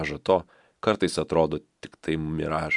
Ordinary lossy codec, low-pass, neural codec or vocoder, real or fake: MP3, 96 kbps; 10.8 kHz; none; real